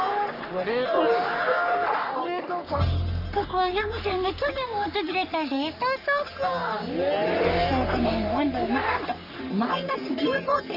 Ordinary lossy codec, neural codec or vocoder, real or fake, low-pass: none; codec, 44.1 kHz, 3.4 kbps, Pupu-Codec; fake; 5.4 kHz